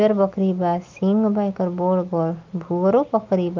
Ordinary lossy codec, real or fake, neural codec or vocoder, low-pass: Opus, 24 kbps; real; none; 7.2 kHz